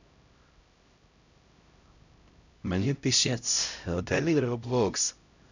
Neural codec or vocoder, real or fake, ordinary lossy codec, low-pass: codec, 16 kHz, 0.5 kbps, X-Codec, HuBERT features, trained on LibriSpeech; fake; none; 7.2 kHz